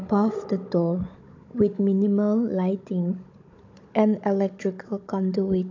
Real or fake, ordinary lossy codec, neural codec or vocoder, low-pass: fake; AAC, 48 kbps; codec, 16 kHz, 16 kbps, FunCodec, trained on Chinese and English, 50 frames a second; 7.2 kHz